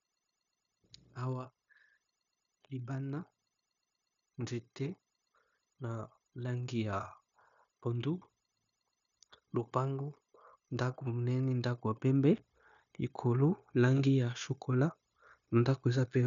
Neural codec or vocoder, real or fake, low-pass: codec, 16 kHz, 0.9 kbps, LongCat-Audio-Codec; fake; 7.2 kHz